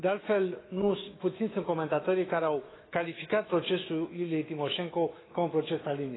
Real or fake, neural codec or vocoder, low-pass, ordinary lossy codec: fake; autoencoder, 48 kHz, 128 numbers a frame, DAC-VAE, trained on Japanese speech; 7.2 kHz; AAC, 16 kbps